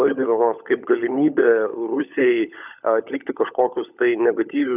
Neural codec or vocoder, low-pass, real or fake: codec, 16 kHz, 16 kbps, FunCodec, trained on LibriTTS, 50 frames a second; 3.6 kHz; fake